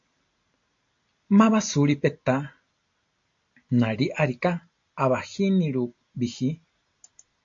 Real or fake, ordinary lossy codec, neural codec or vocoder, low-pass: real; AAC, 48 kbps; none; 7.2 kHz